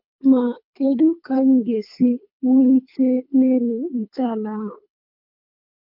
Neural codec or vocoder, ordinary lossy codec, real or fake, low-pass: codec, 24 kHz, 3 kbps, HILCodec; MP3, 48 kbps; fake; 5.4 kHz